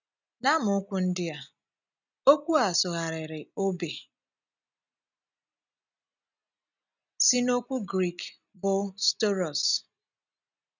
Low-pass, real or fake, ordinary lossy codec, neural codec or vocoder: 7.2 kHz; real; none; none